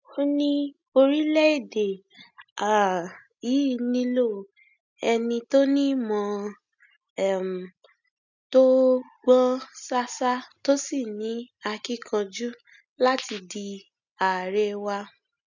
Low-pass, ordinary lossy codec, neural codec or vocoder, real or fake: 7.2 kHz; none; none; real